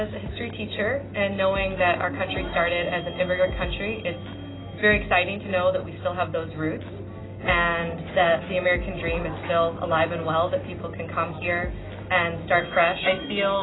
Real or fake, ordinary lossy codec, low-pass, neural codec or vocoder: real; AAC, 16 kbps; 7.2 kHz; none